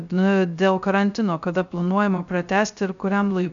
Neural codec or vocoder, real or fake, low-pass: codec, 16 kHz, 0.3 kbps, FocalCodec; fake; 7.2 kHz